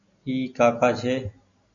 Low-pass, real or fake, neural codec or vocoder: 7.2 kHz; real; none